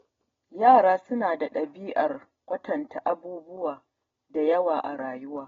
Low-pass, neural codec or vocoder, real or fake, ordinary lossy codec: 7.2 kHz; none; real; AAC, 24 kbps